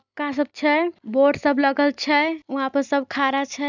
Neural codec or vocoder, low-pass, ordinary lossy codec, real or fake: none; 7.2 kHz; none; real